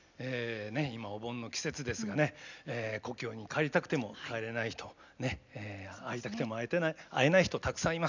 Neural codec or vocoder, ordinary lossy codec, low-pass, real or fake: none; none; 7.2 kHz; real